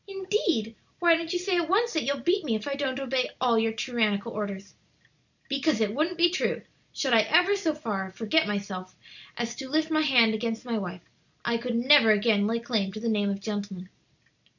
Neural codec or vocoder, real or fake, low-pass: none; real; 7.2 kHz